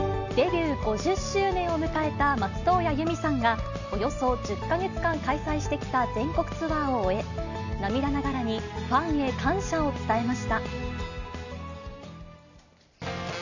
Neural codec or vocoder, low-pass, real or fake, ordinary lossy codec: none; 7.2 kHz; real; none